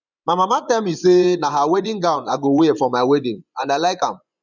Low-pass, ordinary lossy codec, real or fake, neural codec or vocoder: 7.2 kHz; none; fake; vocoder, 24 kHz, 100 mel bands, Vocos